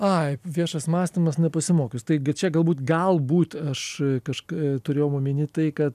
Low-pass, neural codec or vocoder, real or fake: 14.4 kHz; none; real